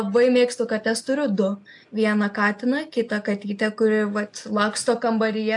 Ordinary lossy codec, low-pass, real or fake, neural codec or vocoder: AAC, 64 kbps; 10.8 kHz; real; none